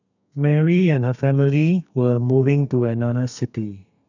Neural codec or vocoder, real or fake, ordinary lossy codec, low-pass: codec, 32 kHz, 1.9 kbps, SNAC; fake; none; 7.2 kHz